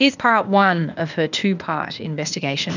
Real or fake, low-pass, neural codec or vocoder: fake; 7.2 kHz; codec, 16 kHz, 0.8 kbps, ZipCodec